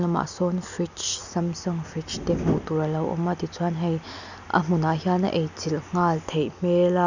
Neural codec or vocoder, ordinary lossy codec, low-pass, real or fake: none; none; 7.2 kHz; real